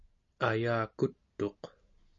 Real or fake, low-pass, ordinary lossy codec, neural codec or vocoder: real; 7.2 kHz; MP3, 48 kbps; none